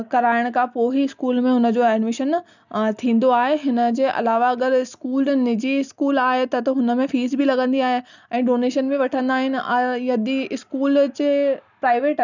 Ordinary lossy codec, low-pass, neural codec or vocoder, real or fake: none; 7.2 kHz; none; real